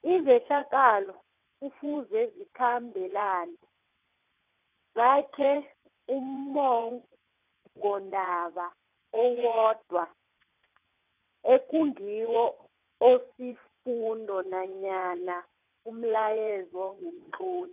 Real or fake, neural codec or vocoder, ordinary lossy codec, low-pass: fake; vocoder, 22.05 kHz, 80 mel bands, WaveNeXt; none; 3.6 kHz